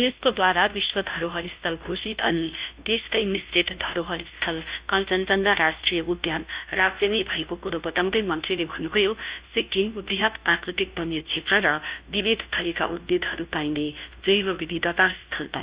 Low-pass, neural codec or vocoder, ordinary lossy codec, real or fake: 3.6 kHz; codec, 16 kHz, 0.5 kbps, FunCodec, trained on Chinese and English, 25 frames a second; Opus, 64 kbps; fake